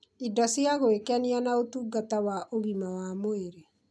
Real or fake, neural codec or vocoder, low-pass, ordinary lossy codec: real; none; none; none